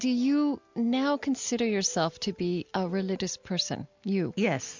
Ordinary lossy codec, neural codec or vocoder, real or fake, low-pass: MP3, 64 kbps; none; real; 7.2 kHz